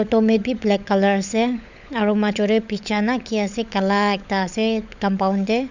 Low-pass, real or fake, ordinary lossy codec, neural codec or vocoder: 7.2 kHz; fake; none; codec, 16 kHz, 16 kbps, FunCodec, trained on LibriTTS, 50 frames a second